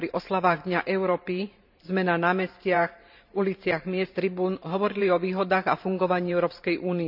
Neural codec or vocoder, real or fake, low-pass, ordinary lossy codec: vocoder, 44.1 kHz, 128 mel bands every 512 samples, BigVGAN v2; fake; 5.4 kHz; none